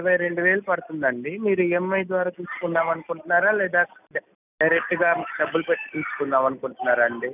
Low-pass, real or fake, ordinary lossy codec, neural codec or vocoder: 3.6 kHz; real; none; none